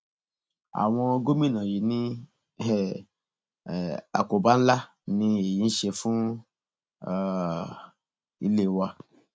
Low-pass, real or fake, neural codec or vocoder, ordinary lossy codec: none; real; none; none